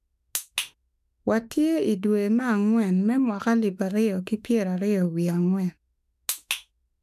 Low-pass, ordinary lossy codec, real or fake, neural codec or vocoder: 14.4 kHz; none; fake; autoencoder, 48 kHz, 32 numbers a frame, DAC-VAE, trained on Japanese speech